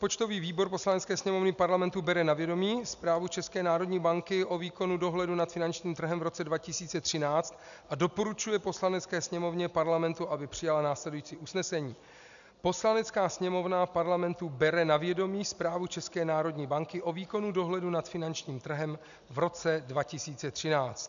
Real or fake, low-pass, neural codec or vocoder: real; 7.2 kHz; none